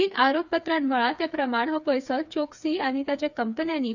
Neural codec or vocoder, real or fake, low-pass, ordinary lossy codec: codec, 16 kHz, 4 kbps, FreqCodec, smaller model; fake; 7.2 kHz; none